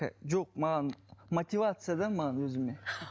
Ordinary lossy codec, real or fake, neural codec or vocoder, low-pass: none; real; none; none